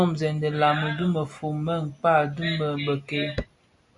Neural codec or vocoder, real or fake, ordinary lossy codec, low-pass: none; real; MP3, 64 kbps; 10.8 kHz